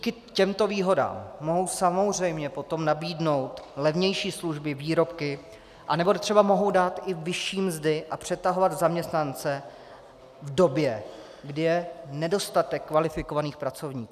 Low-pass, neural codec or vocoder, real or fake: 14.4 kHz; none; real